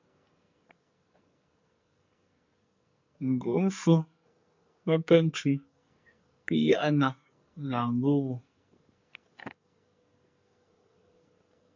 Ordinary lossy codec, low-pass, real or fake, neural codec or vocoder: MP3, 64 kbps; 7.2 kHz; fake; codec, 44.1 kHz, 2.6 kbps, SNAC